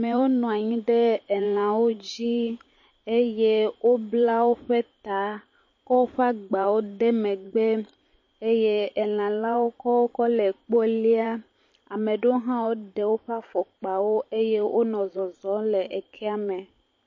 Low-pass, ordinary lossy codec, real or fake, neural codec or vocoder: 7.2 kHz; MP3, 32 kbps; fake; vocoder, 44.1 kHz, 128 mel bands every 512 samples, BigVGAN v2